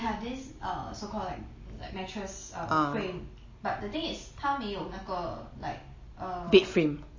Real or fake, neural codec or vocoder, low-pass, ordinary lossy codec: real; none; 7.2 kHz; MP3, 32 kbps